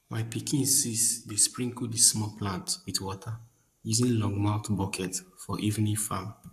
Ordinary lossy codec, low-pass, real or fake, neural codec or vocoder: AAC, 96 kbps; 14.4 kHz; fake; codec, 44.1 kHz, 7.8 kbps, Pupu-Codec